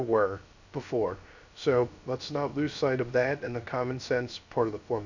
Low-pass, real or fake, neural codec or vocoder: 7.2 kHz; fake; codec, 16 kHz, 0.3 kbps, FocalCodec